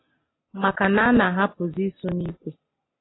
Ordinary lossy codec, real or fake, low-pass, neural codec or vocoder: AAC, 16 kbps; real; 7.2 kHz; none